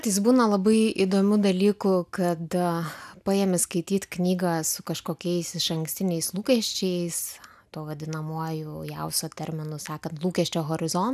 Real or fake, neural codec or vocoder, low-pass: real; none; 14.4 kHz